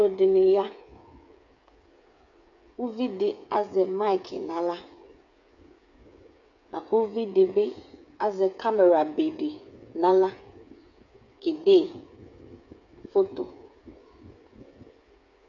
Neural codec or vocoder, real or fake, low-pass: codec, 16 kHz, 8 kbps, FreqCodec, smaller model; fake; 7.2 kHz